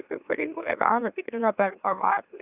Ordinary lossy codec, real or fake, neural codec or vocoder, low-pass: Opus, 64 kbps; fake; autoencoder, 44.1 kHz, a latent of 192 numbers a frame, MeloTTS; 3.6 kHz